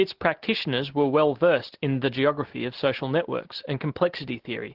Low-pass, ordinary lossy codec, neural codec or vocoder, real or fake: 5.4 kHz; Opus, 16 kbps; none; real